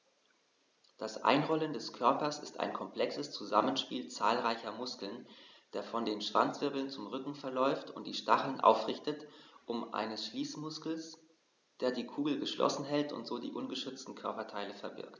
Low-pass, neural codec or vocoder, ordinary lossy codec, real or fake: 7.2 kHz; none; none; real